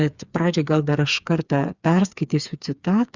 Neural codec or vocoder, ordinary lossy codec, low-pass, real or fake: codec, 16 kHz, 4 kbps, FreqCodec, smaller model; Opus, 64 kbps; 7.2 kHz; fake